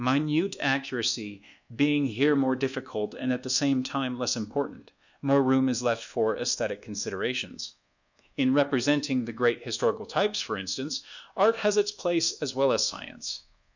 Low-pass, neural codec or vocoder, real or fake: 7.2 kHz; codec, 24 kHz, 1.2 kbps, DualCodec; fake